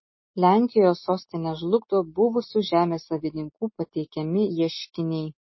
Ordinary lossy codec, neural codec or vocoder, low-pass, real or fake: MP3, 24 kbps; none; 7.2 kHz; real